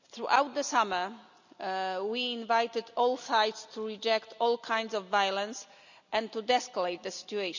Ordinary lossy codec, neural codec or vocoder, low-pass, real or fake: none; none; 7.2 kHz; real